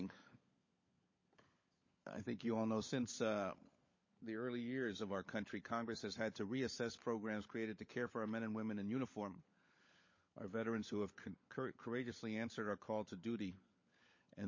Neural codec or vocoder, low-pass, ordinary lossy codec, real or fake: codec, 16 kHz, 16 kbps, FunCodec, trained on LibriTTS, 50 frames a second; 7.2 kHz; MP3, 32 kbps; fake